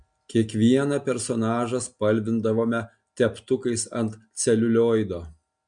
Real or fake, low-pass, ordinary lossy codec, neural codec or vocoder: real; 9.9 kHz; MP3, 64 kbps; none